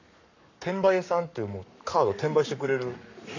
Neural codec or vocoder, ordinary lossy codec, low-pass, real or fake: codec, 44.1 kHz, 7.8 kbps, Pupu-Codec; none; 7.2 kHz; fake